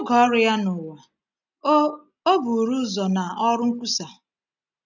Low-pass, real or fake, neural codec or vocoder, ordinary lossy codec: 7.2 kHz; real; none; none